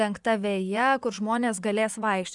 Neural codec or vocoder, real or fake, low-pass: vocoder, 24 kHz, 100 mel bands, Vocos; fake; 10.8 kHz